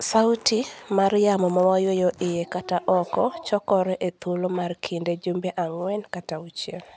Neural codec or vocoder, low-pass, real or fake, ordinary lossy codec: none; none; real; none